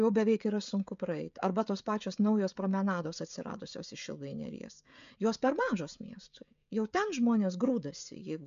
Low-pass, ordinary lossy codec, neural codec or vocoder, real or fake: 7.2 kHz; MP3, 64 kbps; codec, 16 kHz, 16 kbps, FreqCodec, smaller model; fake